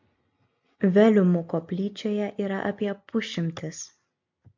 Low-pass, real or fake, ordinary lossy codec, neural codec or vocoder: 7.2 kHz; real; MP3, 48 kbps; none